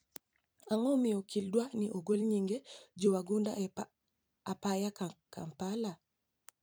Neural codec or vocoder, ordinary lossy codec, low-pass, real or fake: vocoder, 44.1 kHz, 128 mel bands every 256 samples, BigVGAN v2; none; none; fake